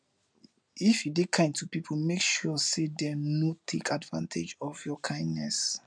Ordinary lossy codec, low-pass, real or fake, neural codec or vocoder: none; 9.9 kHz; real; none